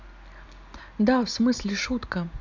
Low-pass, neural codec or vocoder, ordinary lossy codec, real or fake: 7.2 kHz; none; none; real